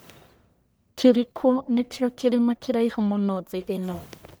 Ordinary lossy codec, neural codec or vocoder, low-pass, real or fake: none; codec, 44.1 kHz, 1.7 kbps, Pupu-Codec; none; fake